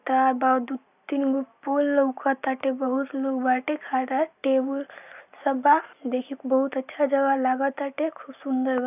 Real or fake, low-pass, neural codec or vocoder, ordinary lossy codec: real; 3.6 kHz; none; none